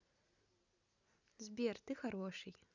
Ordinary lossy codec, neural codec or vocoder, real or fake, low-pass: none; none; real; 7.2 kHz